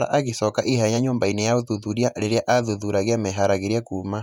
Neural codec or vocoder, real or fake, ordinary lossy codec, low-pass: vocoder, 48 kHz, 128 mel bands, Vocos; fake; none; 19.8 kHz